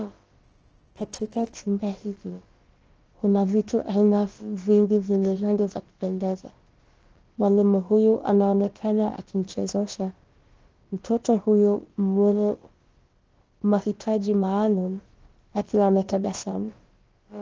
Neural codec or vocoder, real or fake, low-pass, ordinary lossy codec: codec, 16 kHz, about 1 kbps, DyCAST, with the encoder's durations; fake; 7.2 kHz; Opus, 16 kbps